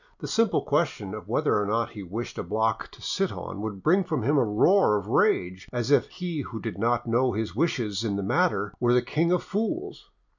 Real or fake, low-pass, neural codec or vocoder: real; 7.2 kHz; none